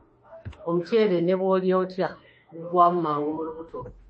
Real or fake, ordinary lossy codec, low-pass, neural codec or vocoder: fake; MP3, 32 kbps; 9.9 kHz; autoencoder, 48 kHz, 32 numbers a frame, DAC-VAE, trained on Japanese speech